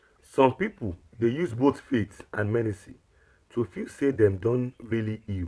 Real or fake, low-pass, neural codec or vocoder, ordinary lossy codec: fake; none; vocoder, 22.05 kHz, 80 mel bands, WaveNeXt; none